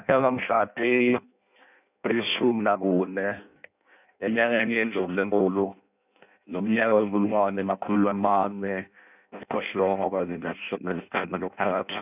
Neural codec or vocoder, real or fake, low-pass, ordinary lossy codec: codec, 16 kHz in and 24 kHz out, 0.6 kbps, FireRedTTS-2 codec; fake; 3.6 kHz; none